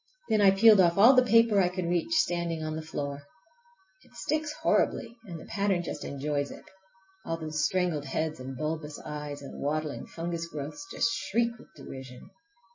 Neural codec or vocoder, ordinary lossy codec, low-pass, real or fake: none; MP3, 32 kbps; 7.2 kHz; real